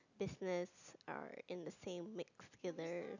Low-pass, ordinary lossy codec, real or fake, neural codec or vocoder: 7.2 kHz; none; real; none